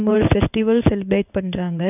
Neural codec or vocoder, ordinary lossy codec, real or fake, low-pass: codec, 16 kHz in and 24 kHz out, 1 kbps, XY-Tokenizer; none; fake; 3.6 kHz